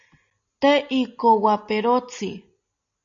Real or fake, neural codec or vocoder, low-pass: real; none; 7.2 kHz